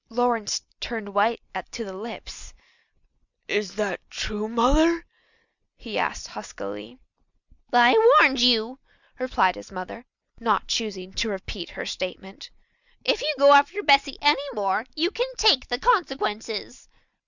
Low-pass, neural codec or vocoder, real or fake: 7.2 kHz; none; real